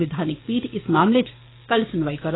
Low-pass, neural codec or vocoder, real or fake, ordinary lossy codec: 7.2 kHz; codec, 16 kHz, 6 kbps, DAC; fake; AAC, 16 kbps